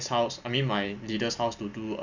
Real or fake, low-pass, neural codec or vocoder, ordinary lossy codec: real; 7.2 kHz; none; none